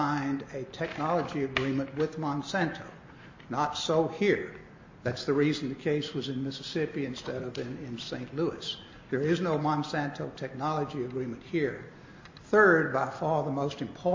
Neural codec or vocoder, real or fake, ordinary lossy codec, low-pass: none; real; MP3, 32 kbps; 7.2 kHz